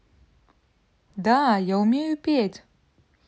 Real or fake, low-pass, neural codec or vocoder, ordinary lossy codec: real; none; none; none